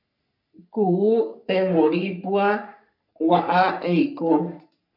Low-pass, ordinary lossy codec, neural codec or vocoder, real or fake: 5.4 kHz; MP3, 48 kbps; codec, 44.1 kHz, 3.4 kbps, Pupu-Codec; fake